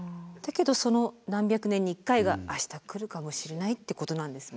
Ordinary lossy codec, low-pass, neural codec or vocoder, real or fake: none; none; none; real